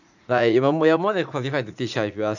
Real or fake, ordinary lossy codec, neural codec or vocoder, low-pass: fake; none; vocoder, 44.1 kHz, 80 mel bands, Vocos; 7.2 kHz